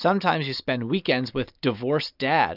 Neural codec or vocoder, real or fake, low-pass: none; real; 5.4 kHz